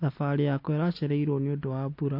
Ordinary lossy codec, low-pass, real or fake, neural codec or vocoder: AAC, 32 kbps; 5.4 kHz; real; none